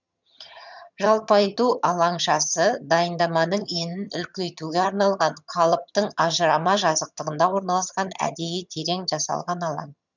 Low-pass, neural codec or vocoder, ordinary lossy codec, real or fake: 7.2 kHz; vocoder, 22.05 kHz, 80 mel bands, HiFi-GAN; none; fake